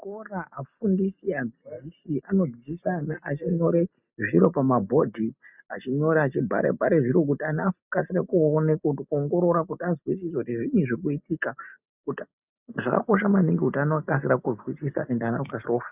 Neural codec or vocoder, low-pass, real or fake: none; 3.6 kHz; real